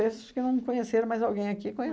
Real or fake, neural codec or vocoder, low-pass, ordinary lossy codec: real; none; none; none